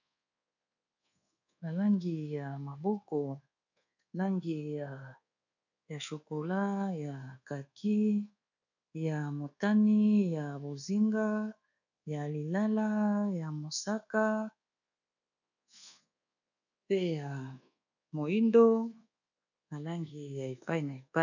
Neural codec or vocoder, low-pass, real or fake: codec, 24 kHz, 1.2 kbps, DualCodec; 7.2 kHz; fake